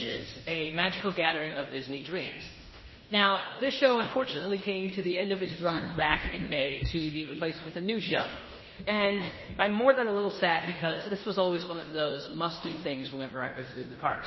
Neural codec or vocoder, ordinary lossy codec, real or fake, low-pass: codec, 16 kHz in and 24 kHz out, 0.9 kbps, LongCat-Audio-Codec, fine tuned four codebook decoder; MP3, 24 kbps; fake; 7.2 kHz